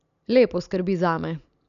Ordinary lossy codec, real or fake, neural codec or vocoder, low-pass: none; real; none; 7.2 kHz